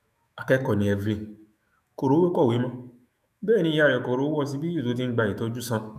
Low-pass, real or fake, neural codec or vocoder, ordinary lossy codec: 14.4 kHz; fake; autoencoder, 48 kHz, 128 numbers a frame, DAC-VAE, trained on Japanese speech; none